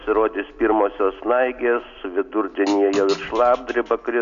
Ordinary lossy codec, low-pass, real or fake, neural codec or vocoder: AAC, 96 kbps; 7.2 kHz; real; none